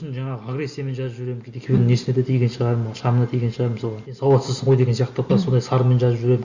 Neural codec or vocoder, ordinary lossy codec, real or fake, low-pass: none; Opus, 64 kbps; real; 7.2 kHz